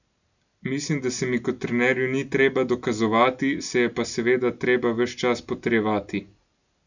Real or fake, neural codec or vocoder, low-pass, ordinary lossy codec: real; none; 7.2 kHz; none